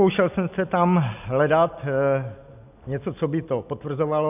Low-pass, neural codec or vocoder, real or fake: 3.6 kHz; none; real